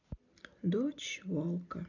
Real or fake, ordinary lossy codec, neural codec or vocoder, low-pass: real; none; none; 7.2 kHz